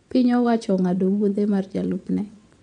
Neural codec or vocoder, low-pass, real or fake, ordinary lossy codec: vocoder, 22.05 kHz, 80 mel bands, WaveNeXt; 9.9 kHz; fake; none